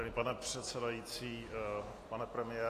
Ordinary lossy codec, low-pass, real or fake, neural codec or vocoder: AAC, 48 kbps; 14.4 kHz; real; none